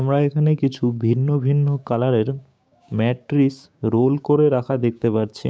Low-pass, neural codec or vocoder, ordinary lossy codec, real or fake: none; codec, 16 kHz, 6 kbps, DAC; none; fake